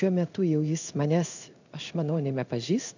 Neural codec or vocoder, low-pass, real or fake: codec, 16 kHz in and 24 kHz out, 1 kbps, XY-Tokenizer; 7.2 kHz; fake